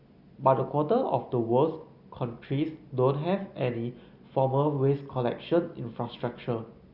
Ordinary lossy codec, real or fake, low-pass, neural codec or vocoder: Opus, 64 kbps; real; 5.4 kHz; none